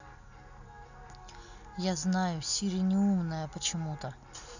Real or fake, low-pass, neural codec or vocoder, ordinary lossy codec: real; 7.2 kHz; none; none